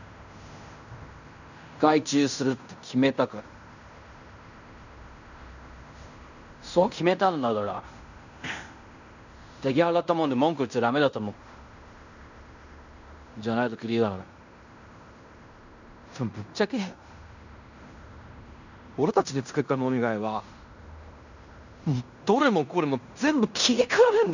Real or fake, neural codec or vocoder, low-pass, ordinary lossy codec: fake; codec, 16 kHz in and 24 kHz out, 0.9 kbps, LongCat-Audio-Codec, fine tuned four codebook decoder; 7.2 kHz; none